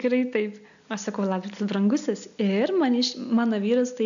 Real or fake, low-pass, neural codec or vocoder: real; 7.2 kHz; none